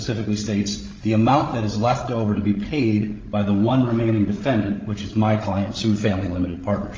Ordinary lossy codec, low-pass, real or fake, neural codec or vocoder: Opus, 32 kbps; 7.2 kHz; fake; codec, 16 kHz, 16 kbps, FreqCodec, larger model